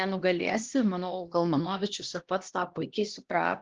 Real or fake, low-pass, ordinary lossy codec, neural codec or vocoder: fake; 7.2 kHz; Opus, 16 kbps; codec, 16 kHz, 1 kbps, X-Codec, HuBERT features, trained on LibriSpeech